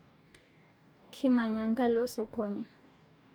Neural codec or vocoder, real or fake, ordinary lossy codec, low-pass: codec, 44.1 kHz, 2.6 kbps, DAC; fake; none; none